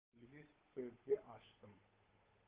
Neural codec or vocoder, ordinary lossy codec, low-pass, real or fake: codec, 16 kHz, 8 kbps, FunCodec, trained on Chinese and English, 25 frames a second; Opus, 24 kbps; 3.6 kHz; fake